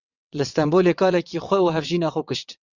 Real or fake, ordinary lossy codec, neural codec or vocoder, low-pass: fake; Opus, 64 kbps; vocoder, 22.05 kHz, 80 mel bands, WaveNeXt; 7.2 kHz